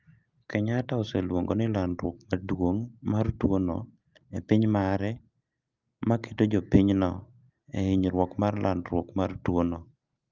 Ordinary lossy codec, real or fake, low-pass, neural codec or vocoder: Opus, 24 kbps; real; 7.2 kHz; none